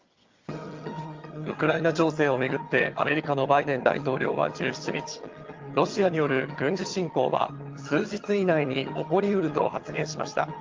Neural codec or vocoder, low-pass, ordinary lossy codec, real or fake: vocoder, 22.05 kHz, 80 mel bands, HiFi-GAN; 7.2 kHz; Opus, 32 kbps; fake